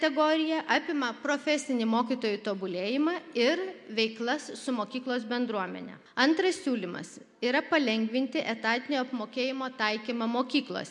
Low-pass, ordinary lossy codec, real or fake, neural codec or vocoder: 10.8 kHz; MP3, 64 kbps; real; none